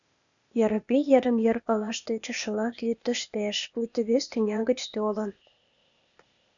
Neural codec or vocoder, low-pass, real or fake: codec, 16 kHz, 0.8 kbps, ZipCodec; 7.2 kHz; fake